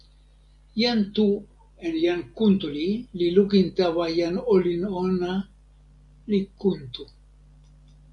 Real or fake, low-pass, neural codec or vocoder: real; 10.8 kHz; none